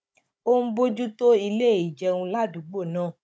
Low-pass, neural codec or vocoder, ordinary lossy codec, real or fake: none; codec, 16 kHz, 16 kbps, FunCodec, trained on Chinese and English, 50 frames a second; none; fake